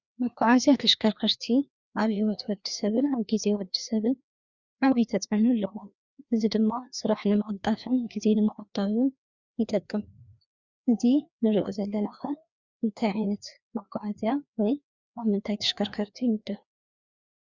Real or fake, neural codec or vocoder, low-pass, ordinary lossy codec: fake; codec, 16 kHz, 2 kbps, FreqCodec, larger model; 7.2 kHz; Opus, 64 kbps